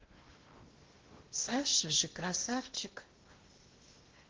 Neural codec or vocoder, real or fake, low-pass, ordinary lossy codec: codec, 16 kHz in and 24 kHz out, 0.8 kbps, FocalCodec, streaming, 65536 codes; fake; 7.2 kHz; Opus, 16 kbps